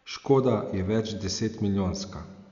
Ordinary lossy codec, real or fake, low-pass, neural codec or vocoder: none; real; 7.2 kHz; none